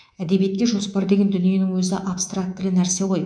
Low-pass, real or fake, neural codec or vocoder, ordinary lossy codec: 9.9 kHz; fake; codec, 24 kHz, 3.1 kbps, DualCodec; none